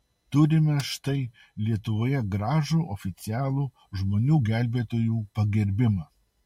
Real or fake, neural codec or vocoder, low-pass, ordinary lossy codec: real; none; 19.8 kHz; MP3, 64 kbps